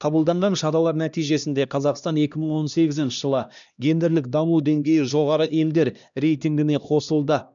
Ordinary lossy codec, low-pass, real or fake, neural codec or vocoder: none; 7.2 kHz; fake; codec, 16 kHz, 1 kbps, X-Codec, HuBERT features, trained on LibriSpeech